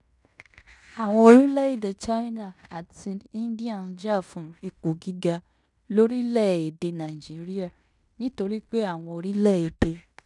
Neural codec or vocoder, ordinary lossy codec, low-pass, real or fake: codec, 16 kHz in and 24 kHz out, 0.9 kbps, LongCat-Audio-Codec, fine tuned four codebook decoder; none; 10.8 kHz; fake